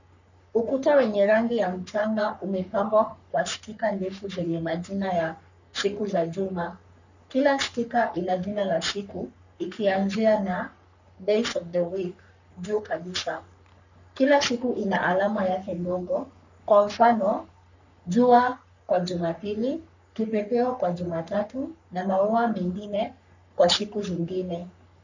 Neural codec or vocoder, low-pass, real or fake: codec, 44.1 kHz, 3.4 kbps, Pupu-Codec; 7.2 kHz; fake